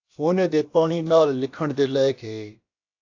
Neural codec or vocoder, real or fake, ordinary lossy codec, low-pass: codec, 16 kHz, about 1 kbps, DyCAST, with the encoder's durations; fake; AAC, 48 kbps; 7.2 kHz